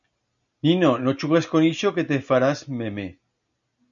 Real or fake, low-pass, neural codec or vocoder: real; 7.2 kHz; none